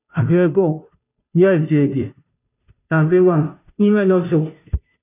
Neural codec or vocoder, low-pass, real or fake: codec, 16 kHz, 0.5 kbps, FunCodec, trained on Chinese and English, 25 frames a second; 3.6 kHz; fake